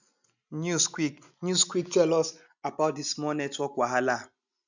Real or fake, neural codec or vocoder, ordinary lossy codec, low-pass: real; none; none; 7.2 kHz